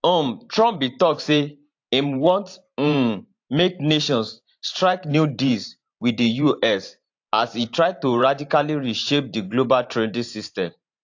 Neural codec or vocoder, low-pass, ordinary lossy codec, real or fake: vocoder, 44.1 kHz, 128 mel bands every 512 samples, BigVGAN v2; 7.2 kHz; AAC, 48 kbps; fake